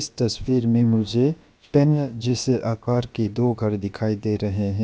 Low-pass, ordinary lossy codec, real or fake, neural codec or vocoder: none; none; fake; codec, 16 kHz, about 1 kbps, DyCAST, with the encoder's durations